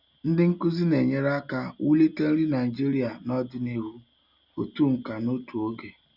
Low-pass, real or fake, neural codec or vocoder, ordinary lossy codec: 5.4 kHz; real; none; none